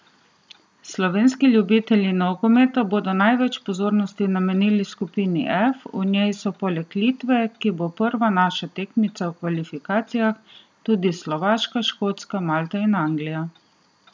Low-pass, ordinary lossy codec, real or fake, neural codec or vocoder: none; none; real; none